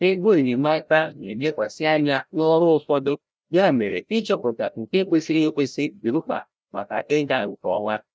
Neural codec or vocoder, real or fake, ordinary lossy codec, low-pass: codec, 16 kHz, 0.5 kbps, FreqCodec, larger model; fake; none; none